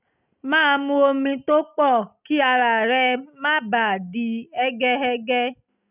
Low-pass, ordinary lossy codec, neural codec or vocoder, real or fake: 3.6 kHz; none; none; real